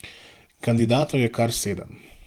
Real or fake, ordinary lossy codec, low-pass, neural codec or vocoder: fake; Opus, 24 kbps; 19.8 kHz; vocoder, 44.1 kHz, 128 mel bands every 512 samples, BigVGAN v2